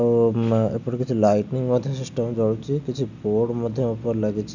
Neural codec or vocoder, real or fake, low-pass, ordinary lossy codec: none; real; 7.2 kHz; none